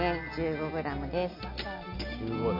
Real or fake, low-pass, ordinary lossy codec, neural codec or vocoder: real; 5.4 kHz; MP3, 48 kbps; none